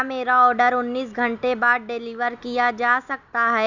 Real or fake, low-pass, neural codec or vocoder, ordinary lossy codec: real; 7.2 kHz; none; none